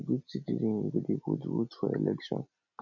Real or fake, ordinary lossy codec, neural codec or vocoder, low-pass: real; none; none; 7.2 kHz